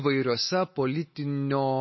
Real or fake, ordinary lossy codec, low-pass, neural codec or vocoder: real; MP3, 24 kbps; 7.2 kHz; none